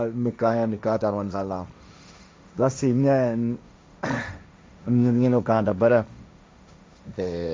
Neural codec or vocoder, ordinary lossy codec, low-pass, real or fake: codec, 16 kHz, 1.1 kbps, Voila-Tokenizer; none; none; fake